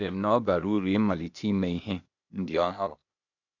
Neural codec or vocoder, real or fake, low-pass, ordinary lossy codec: codec, 16 kHz, 0.8 kbps, ZipCodec; fake; 7.2 kHz; none